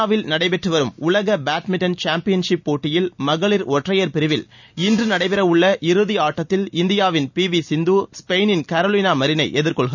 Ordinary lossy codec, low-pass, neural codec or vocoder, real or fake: none; 7.2 kHz; none; real